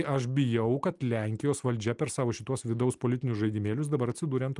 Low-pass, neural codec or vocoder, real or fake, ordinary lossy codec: 10.8 kHz; none; real; Opus, 32 kbps